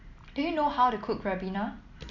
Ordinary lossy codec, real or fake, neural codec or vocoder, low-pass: none; real; none; 7.2 kHz